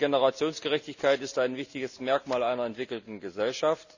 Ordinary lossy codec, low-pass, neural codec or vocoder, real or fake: none; 7.2 kHz; none; real